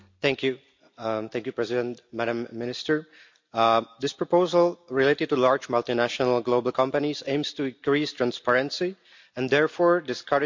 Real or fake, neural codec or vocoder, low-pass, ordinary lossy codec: real; none; 7.2 kHz; MP3, 64 kbps